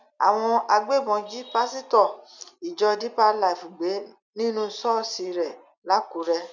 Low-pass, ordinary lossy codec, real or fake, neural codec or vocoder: 7.2 kHz; none; real; none